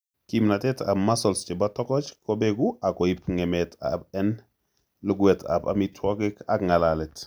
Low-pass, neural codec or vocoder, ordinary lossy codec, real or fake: none; none; none; real